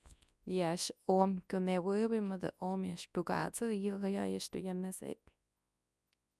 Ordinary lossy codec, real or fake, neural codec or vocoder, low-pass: none; fake; codec, 24 kHz, 0.9 kbps, WavTokenizer, large speech release; none